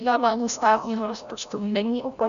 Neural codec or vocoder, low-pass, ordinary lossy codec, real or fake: codec, 16 kHz, 0.5 kbps, FreqCodec, larger model; 7.2 kHz; MP3, 96 kbps; fake